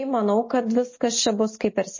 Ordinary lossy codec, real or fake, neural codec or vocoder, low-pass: MP3, 32 kbps; real; none; 7.2 kHz